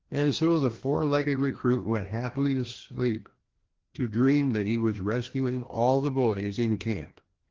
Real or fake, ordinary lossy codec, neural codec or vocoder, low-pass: fake; Opus, 16 kbps; codec, 16 kHz, 1 kbps, FreqCodec, larger model; 7.2 kHz